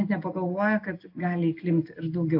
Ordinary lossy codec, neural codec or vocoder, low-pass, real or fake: AAC, 32 kbps; none; 5.4 kHz; real